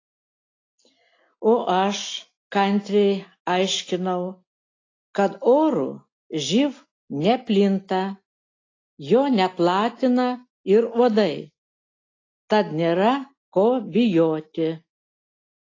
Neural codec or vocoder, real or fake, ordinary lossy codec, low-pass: none; real; AAC, 32 kbps; 7.2 kHz